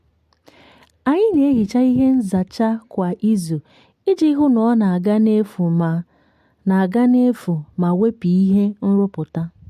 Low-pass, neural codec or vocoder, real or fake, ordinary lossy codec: 14.4 kHz; none; real; MP3, 64 kbps